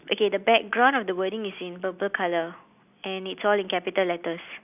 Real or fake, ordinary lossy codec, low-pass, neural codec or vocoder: real; none; 3.6 kHz; none